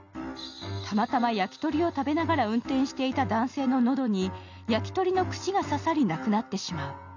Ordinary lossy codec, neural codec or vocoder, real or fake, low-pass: none; none; real; 7.2 kHz